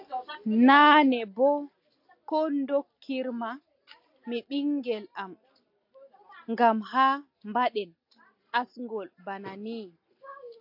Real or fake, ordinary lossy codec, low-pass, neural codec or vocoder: real; AAC, 48 kbps; 5.4 kHz; none